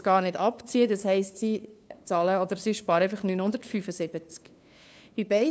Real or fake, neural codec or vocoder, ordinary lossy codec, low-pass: fake; codec, 16 kHz, 2 kbps, FunCodec, trained on LibriTTS, 25 frames a second; none; none